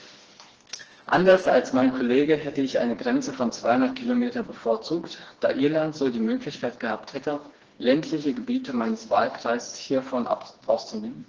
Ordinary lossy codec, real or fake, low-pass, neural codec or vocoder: Opus, 16 kbps; fake; 7.2 kHz; codec, 16 kHz, 2 kbps, FreqCodec, smaller model